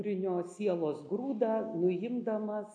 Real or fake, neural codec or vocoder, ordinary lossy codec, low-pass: real; none; AAC, 48 kbps; 9.9 kHz